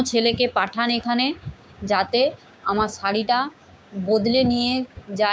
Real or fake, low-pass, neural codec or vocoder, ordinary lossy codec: fake; none; codec, 16 kHz, 6 kbps, DAC; none